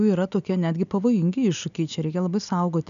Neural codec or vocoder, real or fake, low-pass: none; real; 7.2 kHz